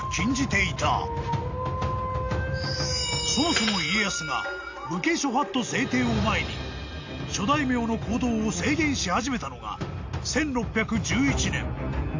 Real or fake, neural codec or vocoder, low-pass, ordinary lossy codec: real; none; 7.2 kHz; AAC, 48 kbps